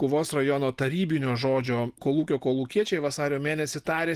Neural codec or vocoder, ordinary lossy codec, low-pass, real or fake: none; Opus, 24 kbps; 14.4 kHz; real